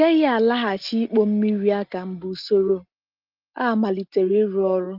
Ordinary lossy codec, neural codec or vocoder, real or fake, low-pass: Opus, 32 kbps; none; real; 5.4 kHz